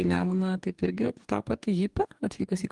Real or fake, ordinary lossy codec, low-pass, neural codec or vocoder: fake; Opus, 24 kbps; 10.8 kHz; codec, 44.1 kHz, 2.6 kbps, DAC